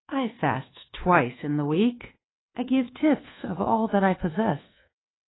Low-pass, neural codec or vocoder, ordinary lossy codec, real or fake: 7.2 kHz; codec, 24 kHz, 1.2 kbps, DualCodec; AAC, 16 kbps; fake